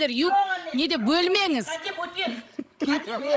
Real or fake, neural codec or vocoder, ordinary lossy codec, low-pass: fake; codec, 16 kHz, 16 kbps, FreqCodec, larger model; none; none